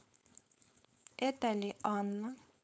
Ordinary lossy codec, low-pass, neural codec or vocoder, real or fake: none; none; codec, 16 kHz, 4.8 kbps, FACodec; fake